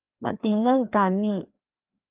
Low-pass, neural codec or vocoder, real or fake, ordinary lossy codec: 3.6 kHz; codec, 16 kHz, 2 kbps, FreqCodec, larger model; fake; Opus, 24 kbps